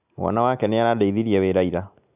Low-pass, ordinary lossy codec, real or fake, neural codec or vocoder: 3.6 kHz; none; real; none